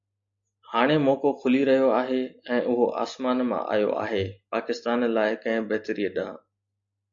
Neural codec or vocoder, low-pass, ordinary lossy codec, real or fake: none; 7.2 kHz; AAC, 64 kbps; real